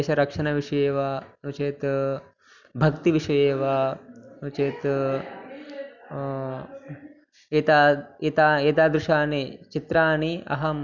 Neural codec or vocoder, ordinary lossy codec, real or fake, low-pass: none; none; real; 7.2 kHz